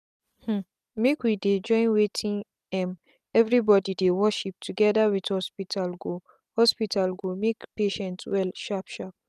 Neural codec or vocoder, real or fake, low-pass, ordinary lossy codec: none; real; 14.4 kHz; none